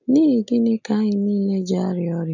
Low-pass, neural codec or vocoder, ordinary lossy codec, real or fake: 7.2 kHz; none; none; real